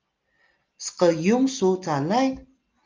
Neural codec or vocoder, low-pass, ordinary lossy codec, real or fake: none; 7.2 kHz; Opus, 24 kbps; real